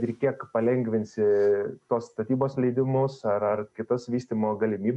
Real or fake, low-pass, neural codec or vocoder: real; 10.8 kHz; none